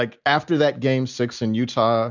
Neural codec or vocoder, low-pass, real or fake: none; 7.2 kHz; real